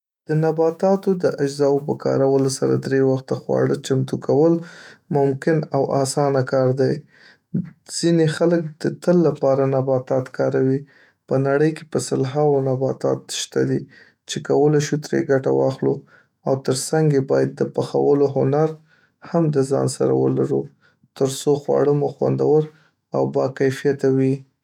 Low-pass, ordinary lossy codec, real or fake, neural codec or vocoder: 19.8 kHz; none; fake; autoencoder, 48 kHz, 128 numbers a frame, DAC-VAE, trained on Japanese speech